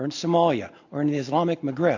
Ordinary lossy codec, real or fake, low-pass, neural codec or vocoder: AAC, 48 kbps; real; 7.2 kHz; none